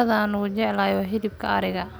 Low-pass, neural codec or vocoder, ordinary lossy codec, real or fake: none; none; none; real